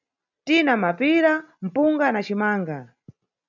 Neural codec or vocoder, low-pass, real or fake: none; 7.2 kHz; real